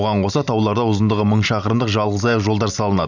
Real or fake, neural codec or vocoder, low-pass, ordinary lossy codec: real; none; 7.2 kHz; none